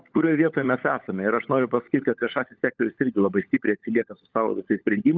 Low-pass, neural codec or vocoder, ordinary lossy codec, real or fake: 7.2 kHz; codec, 16 kHz, 16 kbps, FunCodec, trained on LibriTTS, 50 frames a second; Opus, 24 kbps; fake